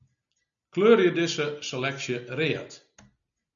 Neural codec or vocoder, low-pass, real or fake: none; 7.2 kHz; real